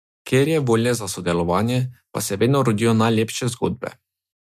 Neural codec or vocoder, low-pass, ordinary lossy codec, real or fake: none; 14.4 kHz; MP3, 96 kbps; real